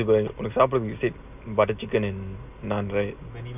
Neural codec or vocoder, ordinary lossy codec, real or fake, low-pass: none; none; real; 3.6 kHz